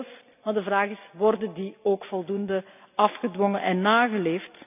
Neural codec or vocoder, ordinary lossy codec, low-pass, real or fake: none; none; 3.6 kHz; real